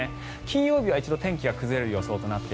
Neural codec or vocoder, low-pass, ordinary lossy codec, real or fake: none; none; none; real